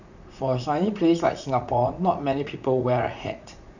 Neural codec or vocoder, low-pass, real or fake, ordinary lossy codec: vocoder, 44.1 kHz, 80 mel bands, Vocos; 7.2 kHz; fake; none